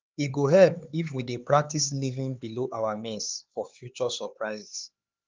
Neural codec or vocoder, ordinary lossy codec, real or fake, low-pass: codec, 16 kHz, 4 kbps, X-Codec, HuBERT features, trained on LibriSpeech; Opus, 32 kbps; fake; 7.2 kHz